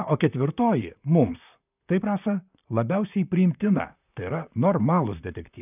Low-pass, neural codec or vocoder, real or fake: 3.6 kHz; vocoder, 44.1 kHz, 128 mel bands, Pupu-Vocoder; fake